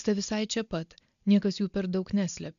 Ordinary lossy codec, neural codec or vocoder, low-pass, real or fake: AAC, 96 kbps; none; 7.2 kHz; real